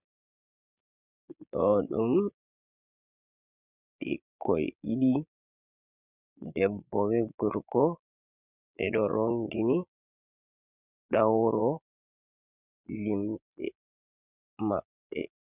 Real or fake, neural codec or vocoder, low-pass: fake; vocoder, 22.05 kHz, 80 mel bands, Vocos; 3.6 kHz